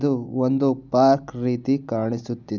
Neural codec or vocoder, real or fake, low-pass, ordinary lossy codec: none; real; 7.2 kHz; none